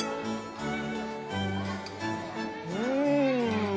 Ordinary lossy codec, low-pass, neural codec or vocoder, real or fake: none; none; none; real